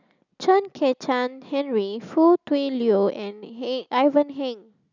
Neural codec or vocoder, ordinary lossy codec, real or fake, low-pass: none; none; real; 7.2 kHz